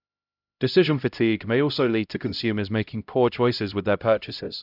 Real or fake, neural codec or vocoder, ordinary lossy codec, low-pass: fake; codec, 16 kHz, 0.5 kbps, X-Codec, HuBERT features, trained on LibriSpeech; none; 5.4 kHz